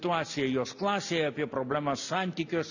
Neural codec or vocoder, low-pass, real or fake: none; 7.2 kHz; real